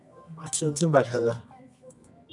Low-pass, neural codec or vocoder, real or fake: 10.8 kHz; codec, 24 kHz, 0.9 kbps, WavTokenizer, medium music audio release; fake